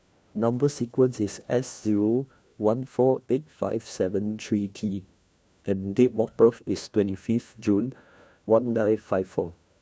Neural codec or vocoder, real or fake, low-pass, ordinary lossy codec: codec, 16 kHz, 1 kbps, FunCodec, trained on LibriTTS, 50 frames a second; fake; none; none